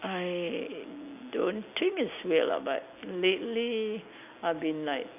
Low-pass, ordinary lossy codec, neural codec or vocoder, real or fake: 3.6 kHz; none; none; real